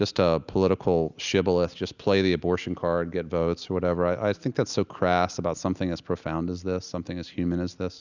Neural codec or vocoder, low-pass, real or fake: none; 7.2 kHz; real